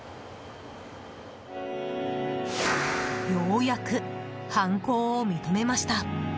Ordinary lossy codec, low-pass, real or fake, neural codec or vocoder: none; none; real; none